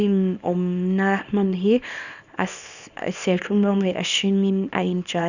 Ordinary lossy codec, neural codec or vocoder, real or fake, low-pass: none; codec, 24 kHz, 0.9 kbps, WavTokenizer, small release; fake; 7.2 kHz